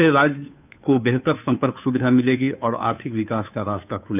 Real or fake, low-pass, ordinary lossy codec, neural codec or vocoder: fake; 3.6 kHz; none; codec, 16 kHz, 2 kbps, FunCodec, trained on Chinese and English, 25 frames a second